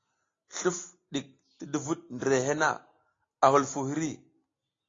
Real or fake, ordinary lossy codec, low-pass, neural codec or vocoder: real; AAC, 32 kbps; 7.2 kHz; none